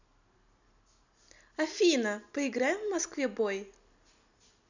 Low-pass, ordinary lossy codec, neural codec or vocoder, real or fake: 7.2 kHz; none; none; real